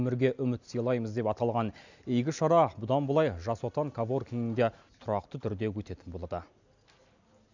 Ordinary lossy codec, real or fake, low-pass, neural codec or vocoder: none; real; 7.2 kHz; none